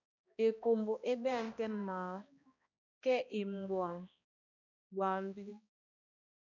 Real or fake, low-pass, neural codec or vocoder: fake; 7.2 kHz; codec, 16 kHz, 1 kbps, X-Codec, HuBERT features, trained on balanced general audio